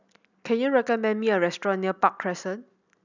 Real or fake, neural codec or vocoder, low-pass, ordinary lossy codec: real; none; 7.2 kHz; none